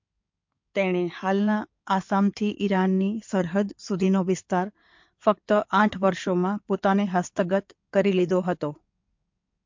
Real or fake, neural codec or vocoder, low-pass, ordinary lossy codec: fake; codec, 16 kHz in and 24 kHz out, 2.2 kbps, FireRedTTS-2 codec; 7.2 kHz; MP3, 48 kbps